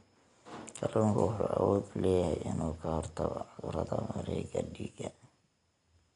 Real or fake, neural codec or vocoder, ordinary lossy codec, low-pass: real; none; MP3, 64 kbps; 10.8 kHz